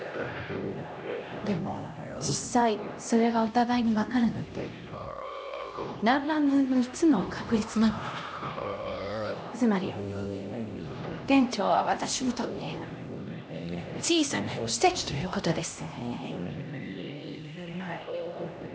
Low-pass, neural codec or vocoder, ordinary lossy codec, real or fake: none; codec, 16 kHz, 1 kbps, X-Codec, HuBERT features, trained on LibriSpeech; none; fake